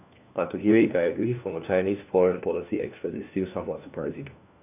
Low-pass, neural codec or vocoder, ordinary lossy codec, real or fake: 3.6 kHz; codec, 16 kHz, 1 kbps, FunCodec, trained on LibriTTS, 50 frames a second; none; fake